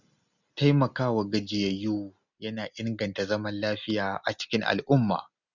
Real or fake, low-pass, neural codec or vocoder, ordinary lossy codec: real; 7.2 kHz; none; none